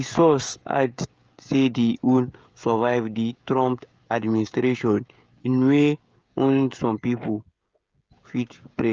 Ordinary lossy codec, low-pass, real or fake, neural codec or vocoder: Opus, 24 kbps; 7.2 kHz; fake; codec, 16 kHz, 16 kbps, FreqCodec, smaller model